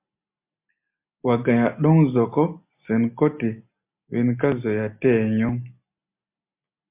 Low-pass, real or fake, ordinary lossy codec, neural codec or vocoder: 3.6 kHz; real; MP3, 32 kbps; none